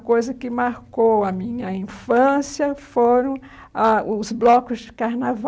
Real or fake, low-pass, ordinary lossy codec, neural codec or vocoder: real; none; none; none